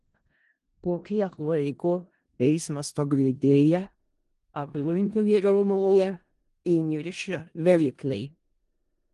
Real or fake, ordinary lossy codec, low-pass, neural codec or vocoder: fake; Opus, 24 kbps; 10.8 kHz; codec, 16 kHz in and 24 kHz out, 0.4 kbps, LongCat-Audio-Codec, four codebook decoder